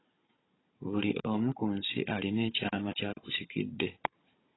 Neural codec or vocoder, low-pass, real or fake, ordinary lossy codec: codec, 16 kHz, 16 kbps, FunCodec, trained on Chinese and English, 50 frames a second; 7.2 kHz; fake; AAC, 16 kbps